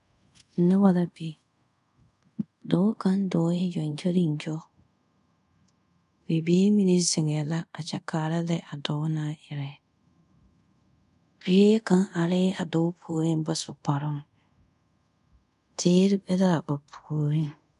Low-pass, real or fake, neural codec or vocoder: 10.8 kHz; fake; codec, 24 kHz, 0.5 kbps, DualCodec